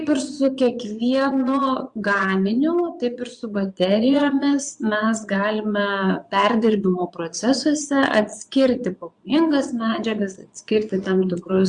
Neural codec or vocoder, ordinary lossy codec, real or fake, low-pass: vocoder, 22.05 kHz, 80 mel bands, Vocos; Opus, 64 kbps; fake; 9.9 kHz